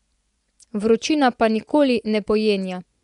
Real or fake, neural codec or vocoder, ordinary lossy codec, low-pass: fake; vocoder, 24 kHz, 100 mel bands, Vocos; none; 10.8 kHz